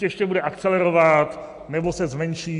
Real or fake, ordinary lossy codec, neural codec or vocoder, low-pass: real; AAC, 64 kbps; none; 10.8 kHz